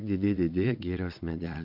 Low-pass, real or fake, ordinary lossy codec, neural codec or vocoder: 5.4 kHz; fake; MP3, 48 kbps; vocoder, 22.05 kHz, 80 mel bands, WaveNeXt